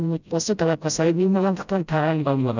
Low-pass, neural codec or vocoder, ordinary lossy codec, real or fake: 7.2 kHz; codec, 16 kHz, 0.5 kbps, FreqCodec, smaller model; none; fake